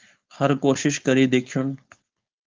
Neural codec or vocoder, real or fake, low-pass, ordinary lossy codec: codec, 16 kHz, 4.8 kbps, FACodec; fake; 7.2 kHz; Opus, 24 kbps